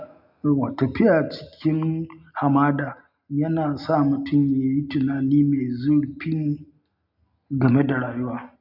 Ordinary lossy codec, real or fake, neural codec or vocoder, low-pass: none; real; none; 5.4 kHz